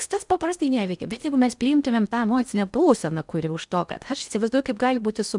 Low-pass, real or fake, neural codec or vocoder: 10.8 kHz; fake; codec, 16 kHz in and 24 kHz out, 0.6 kbps, FocalCodec, streaming, 4096 codes